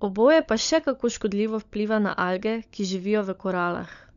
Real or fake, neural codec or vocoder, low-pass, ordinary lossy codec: fake; codec, 16 kHz, 16 kbps, FunCodec, trained on LibriTTS, 50 frames a second; 7.2 kHz; none